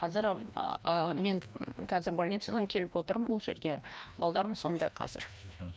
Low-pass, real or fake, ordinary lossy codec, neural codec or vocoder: none; fake; none; codec, 16 kHz, 1 kbps, FreqCodec, larger model